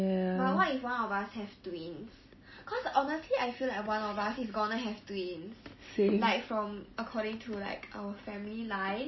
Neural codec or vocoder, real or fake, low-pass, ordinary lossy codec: none; real; 7.2 kHz; MP3, 24 kbps